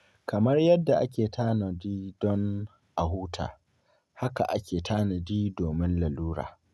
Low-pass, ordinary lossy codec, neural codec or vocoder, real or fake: none; none; none; real